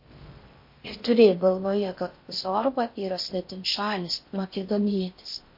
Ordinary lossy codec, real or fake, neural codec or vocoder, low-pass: MP3, 32 kbps; fake; codec, 16 kHz in and 24 kHz out, 0.6 kbps, FocalCodec, streaming, 4096 codes; 5.4 kHz